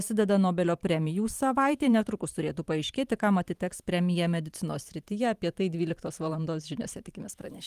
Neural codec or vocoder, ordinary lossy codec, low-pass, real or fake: vocoder, 44.1 kHz, 128 mel bands every 512 samples, BigVGAN v2; Opus, 24 kbps; 14.4 kHz; fake